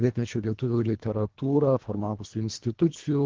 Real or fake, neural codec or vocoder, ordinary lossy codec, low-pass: fake; codec, 24 kHz, 1.5 kbps, HILCodec; Opus, 16 kbps; 7.2 kHz